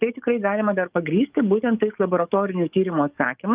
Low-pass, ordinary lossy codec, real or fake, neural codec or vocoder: 3.6 kHz; Opus, 24 kbps; fake; codec, 44.1 kHz, 7.8 kbps, DAC